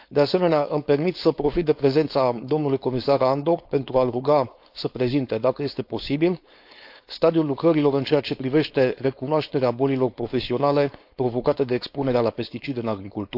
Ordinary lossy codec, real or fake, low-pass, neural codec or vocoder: AAC, 48 kbps; fake; 5.4 kHz; codec, 16 kHz, 4.8 kbps, FACodec